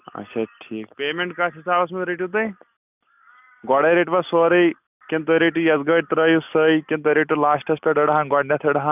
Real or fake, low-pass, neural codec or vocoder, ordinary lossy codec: real; 3.6 kHz; none; none